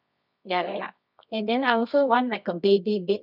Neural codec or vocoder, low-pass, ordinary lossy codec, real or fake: codec, 24 kHz, 0.9 kbps, WavTokenizer, medium music audio release; 5.4 kHz; none; fake